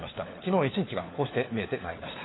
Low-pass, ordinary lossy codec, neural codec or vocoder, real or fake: 7.2 kHz; AAC, 16 kbps; codec, 16 kHz, 4 kbps, FunCodec, trained on LibriTTS, 50 frames a second; fake